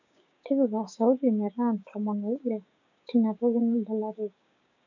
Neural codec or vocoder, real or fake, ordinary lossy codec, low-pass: codec, 16 kHz, 6 kbps, DAC; fake; none; 7.2 kHz